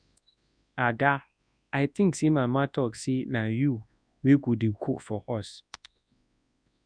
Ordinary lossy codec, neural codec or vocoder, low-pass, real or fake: none; codec, 24 kHz, 0.9 kbps, WavTokenizer, large speech release; 9.9 kHz; fake